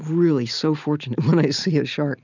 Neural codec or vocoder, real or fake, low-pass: none; real; 7.2 kHz